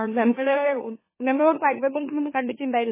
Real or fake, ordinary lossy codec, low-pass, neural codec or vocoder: fake; MP3, 16 kbps; 3.6 kHz; autoencoder, 44.1 kHz, a latent of 192 numbers a frame, MeloTTS